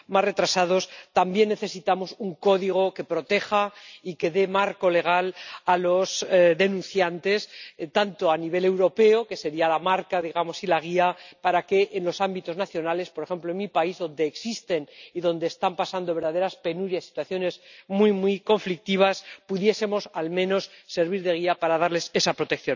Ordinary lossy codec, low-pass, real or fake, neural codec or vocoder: none; 7.2 kHz; real; none